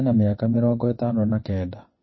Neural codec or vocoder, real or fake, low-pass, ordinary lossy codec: vocoder, 22.05 kHz, 80 mel bands, WaveNeXt; fake; 7.2 kHz; MP3, 24 kbps